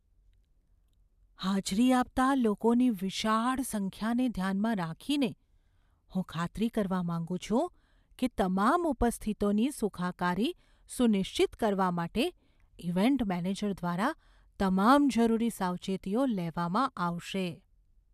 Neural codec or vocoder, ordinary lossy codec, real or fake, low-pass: none; none; real; 14.4 kHz